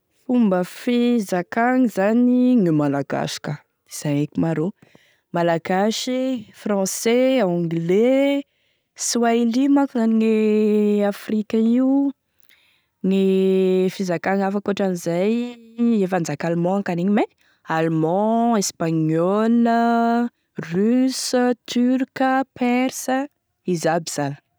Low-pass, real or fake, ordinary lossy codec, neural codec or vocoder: none; real; none; none